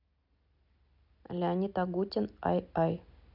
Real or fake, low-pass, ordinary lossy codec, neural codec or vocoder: real; 5.4 kHz; none; none